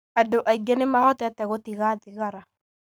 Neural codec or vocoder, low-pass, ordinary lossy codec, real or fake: codec, 44.1 kHz, 7.8 kbps, Pupu-Codec; none; none; fake